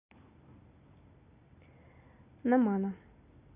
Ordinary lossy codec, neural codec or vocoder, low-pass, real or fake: none; none; 3.6 kHz; real